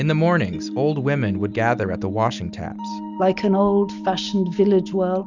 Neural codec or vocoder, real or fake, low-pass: none; real; 7.2 kHz